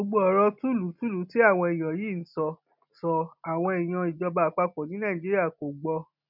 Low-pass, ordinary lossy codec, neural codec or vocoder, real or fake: 5.4 kHz; none; none; real